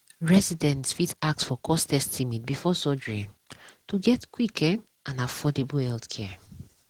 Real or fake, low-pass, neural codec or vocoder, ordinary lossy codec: real; 19.8 kHz; none; Opus, 16 kbps